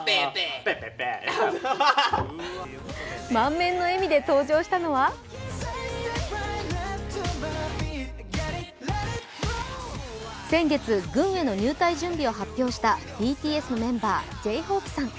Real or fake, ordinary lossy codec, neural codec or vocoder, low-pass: real; none; none; none